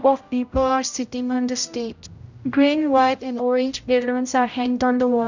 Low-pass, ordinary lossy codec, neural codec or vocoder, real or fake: 7.2 kHz; none; codec, 16 kHz, 0.5 kbps, X-Codec, HuBERT features, trained on general audio; fake